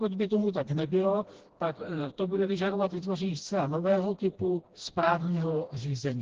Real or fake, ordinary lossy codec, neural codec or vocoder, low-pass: fake; Opus, 16 kbps; codec, 16 kHz, 1 kbps, FreqCodec, smaller model; 7.2 kHz